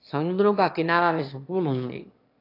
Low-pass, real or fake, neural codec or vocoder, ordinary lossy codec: 5.4 kHz; fake; autoencoder, 22.05 kHz, a latent of 192 numbers a frame, VITS, trained on one speaker; AAC, 32 kbps